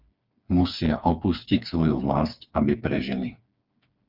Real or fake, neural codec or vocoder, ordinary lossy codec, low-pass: fake; codec, 16 kHz, 4 kbps, FreqCodec, smaller model; Opus, 24 kbps; 5.4 kHz